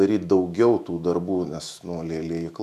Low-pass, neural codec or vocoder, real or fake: 14.4 kHz; autoencoder, 48 kHz, 128 numbers a frame, DAC-VAE, trained on Japanese speech; fake